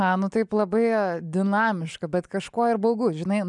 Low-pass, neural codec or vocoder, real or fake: 10.8 kHz; none; real